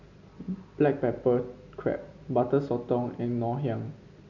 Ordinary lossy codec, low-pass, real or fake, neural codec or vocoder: none; 7.2 kHz; real; none